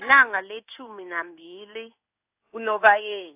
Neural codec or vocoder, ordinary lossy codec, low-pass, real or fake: codec, 16 kHz in and 24 kHz out, 1 kbps, XY-Tokenizer; AAC, 32 kbps; 3.6 kHz; fake